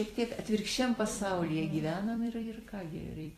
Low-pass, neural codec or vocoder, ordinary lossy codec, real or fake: 14.4 kHz; vocoder, 44.1 kHz, 128 mel bands every 256 samples, BigVGAN v2; AAC, 48 kbps; fake